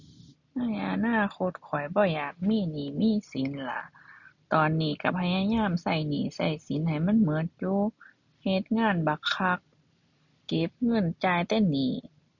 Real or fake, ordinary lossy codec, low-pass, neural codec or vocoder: real; none; 7.2 kHz; none